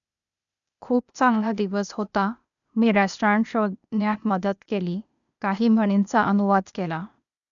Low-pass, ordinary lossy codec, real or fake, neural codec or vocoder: 7.2 kHz; none; fake; codec, 16 kHz, 0.8 kbps, ZipCodec